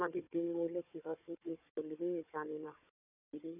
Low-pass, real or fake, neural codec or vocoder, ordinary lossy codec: 3.6 kHz; fake; codec, 16 kHz, 4 kbps, FunCodec, trained on Chinese and English, 50 frames a second; none